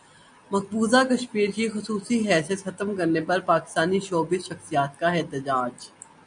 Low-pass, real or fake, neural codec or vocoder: 9.9 kHz; real; none